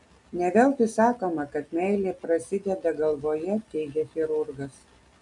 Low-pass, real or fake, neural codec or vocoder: 10.8 kHz; real; none